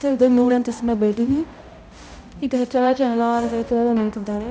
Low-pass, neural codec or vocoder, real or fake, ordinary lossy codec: none; codec, 16 kHz, 0.5 kbps, X-Codec, HuBERT features, trained on balanced general audio; fake; none